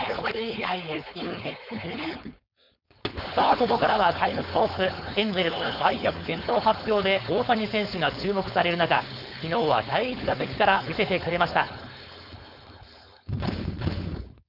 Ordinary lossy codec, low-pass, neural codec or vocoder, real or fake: none; 5.4 kHz; codec, 16 kHz, 4.8 kbps, FACodec; fake